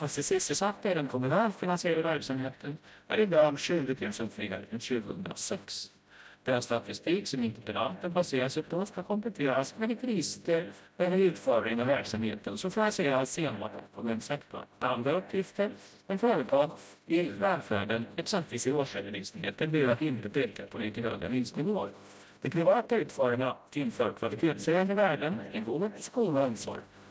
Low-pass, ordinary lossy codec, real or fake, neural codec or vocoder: none; none; fake; codec, 16 kHz, 0.5 kbps, FreqCodec, smaller model